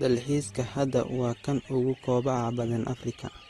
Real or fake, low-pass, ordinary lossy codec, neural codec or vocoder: real; 14.4 kHz; AAC, 32 kbps; none